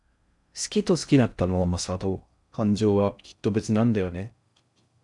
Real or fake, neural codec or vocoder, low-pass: fake; codec, 16 kHz in and 24 kHz out, 0.6 kbps, FocalCodec, streaming, 4096 codes; 10.8 kHz